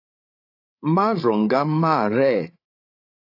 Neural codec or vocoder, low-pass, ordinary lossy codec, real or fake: codec, 16 kHz, 8 kbps, FreqCodec, larger model; 5.4 kHz; AAC, 32 kbps; fake